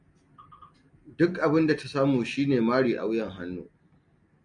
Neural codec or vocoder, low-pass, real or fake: none; 9.9 kHz; real